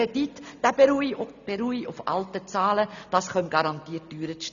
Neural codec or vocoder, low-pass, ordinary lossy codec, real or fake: none; 7.2 kHz; none; real